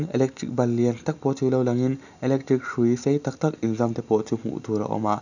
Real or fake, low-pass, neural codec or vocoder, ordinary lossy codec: real; 7.2 kHz; none; none